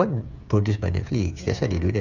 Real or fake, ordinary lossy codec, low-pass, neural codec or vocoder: fake; none; 7.2 kHz; codec, 16 kHz, 8 kbps, FreqCodec, smaller model